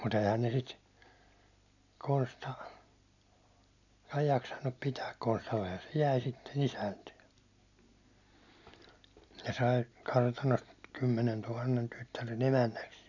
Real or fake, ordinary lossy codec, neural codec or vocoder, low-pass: real; none; none; 7.2 kHz